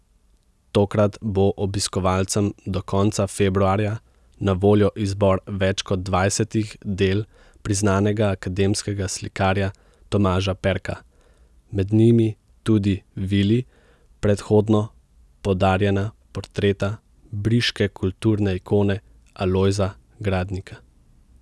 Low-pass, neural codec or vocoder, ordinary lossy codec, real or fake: none; none; none; real